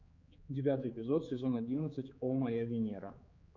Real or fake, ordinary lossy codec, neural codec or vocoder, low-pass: fake; MP3, 48 kbps; codec, 16 kHz, 4 kbps, X-Codec, HuBERT features, trained on general audio; 7.2 kHz